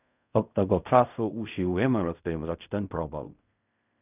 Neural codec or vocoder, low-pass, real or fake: codec, 16 kHz in and 24 kHz out, 0.4 kbps, LongCat-Audio-Codec, fine tuned four codebook decoder; 3.6 kHz; fake